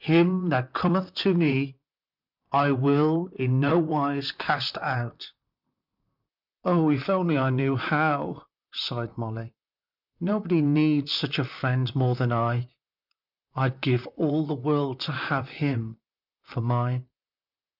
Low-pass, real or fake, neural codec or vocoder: 5.4 kHz; fake; vocoder, 44.1 kHz, 128 mel bands, Pupu-Vocoder